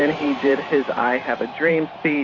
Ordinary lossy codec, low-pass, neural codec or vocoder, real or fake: MP3, 32 kbps; 7.2 kHz; vocoder, 44.1 kHz, 128 mel bands every 512 samples, BigVGAN v2; fake